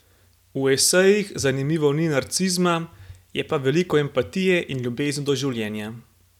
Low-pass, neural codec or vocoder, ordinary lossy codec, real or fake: 19.8 kHz; none; none; real